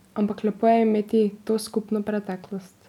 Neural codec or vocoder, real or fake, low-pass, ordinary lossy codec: none; real; 19.8 kHz; none